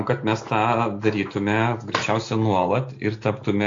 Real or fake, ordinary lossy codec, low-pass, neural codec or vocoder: real; AAC, 48 kbps; 7.2 kHz; none